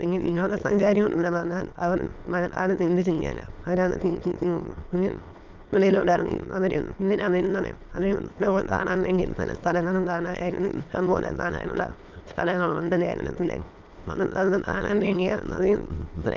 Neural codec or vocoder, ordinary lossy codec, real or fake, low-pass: autoencoder, 22.05 kHz, a latent of 192 numbers a frame, VITS, trained on many speakers; Opus, 24 kbps; fake; 7.2 kHz